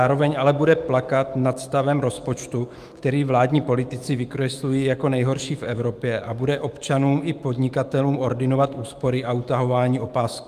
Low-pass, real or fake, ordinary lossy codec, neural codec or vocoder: 14.4 kHz; fake; Opus, 32 kbps; autoencoder, 48 kHz, 128 numbers a frame, DAC-VAE, trained on Japanese speech